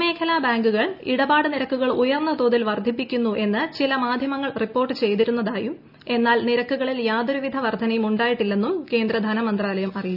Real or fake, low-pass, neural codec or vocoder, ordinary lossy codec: real; 5.4 kHz; none; none